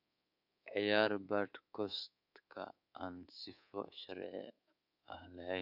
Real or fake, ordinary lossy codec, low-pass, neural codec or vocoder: fake; none; 5.4 kHz; codec, 24 kHz, 3.1 kbps, DualCodec